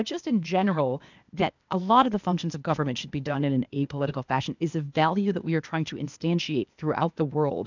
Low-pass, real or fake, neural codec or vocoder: 7.2 kHz; fake; codec, 16 kHz, 0.8 kbps, ZipCodec